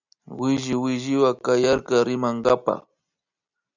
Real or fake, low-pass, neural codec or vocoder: real; 7.2 kHz; none